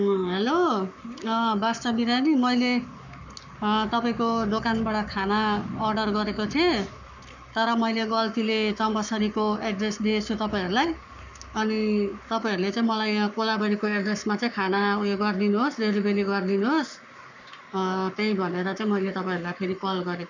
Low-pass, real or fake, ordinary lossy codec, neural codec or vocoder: 7.2 kHz; fake; none; codec, 44.1 kHz, 7.8 kbps, Pupu-Codec